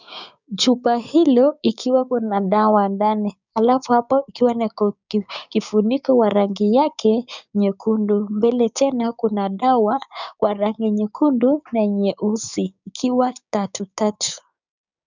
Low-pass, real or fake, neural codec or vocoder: 7.2 kHz; fake; codec, 16 kHz, 6 kbps, DAC